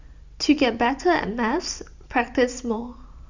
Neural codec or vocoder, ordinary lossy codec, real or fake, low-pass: vocoder, 44.1 kHz, 128 mel bands every 512 samples, BigVGAN v2; Opus, 64 kbps; fake; 7.2 kHz